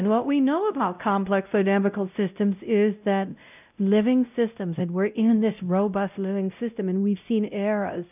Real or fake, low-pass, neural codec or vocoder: fake; 3.6 kHz; codec, 16 kHz, 0.5 kbps, X-Codec, WavLM features, trained on Multilingual LibriSpeech